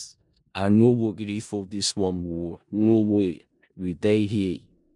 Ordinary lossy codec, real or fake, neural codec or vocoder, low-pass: none; fake; codec, 16 kHz in and 24 kHz out, 0.4 kbps, LongCat-Audio-Codec, four codebook decoder; 10.8 kHz